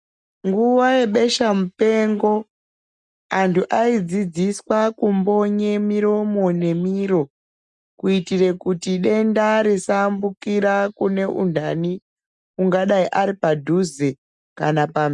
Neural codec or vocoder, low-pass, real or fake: none; 10.8 kHz; real